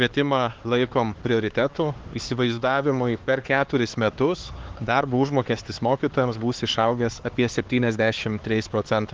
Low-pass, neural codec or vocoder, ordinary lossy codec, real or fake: 7.2 kHz; codec, 16 kHz, 4 kbps, X-Codec, HuBERT features, trained on LibriSpeech; Opus, 16 kbps; fake